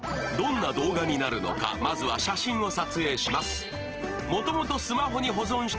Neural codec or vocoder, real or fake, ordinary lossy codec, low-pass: none; real; Opus, 16 kbps; 7.2 kHz